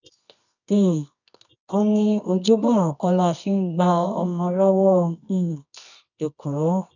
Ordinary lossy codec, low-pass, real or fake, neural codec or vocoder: none; 7.2 kHz; fake; codec, 24 kHz, 0.9 kbps, WavTokenizer, medium music audio release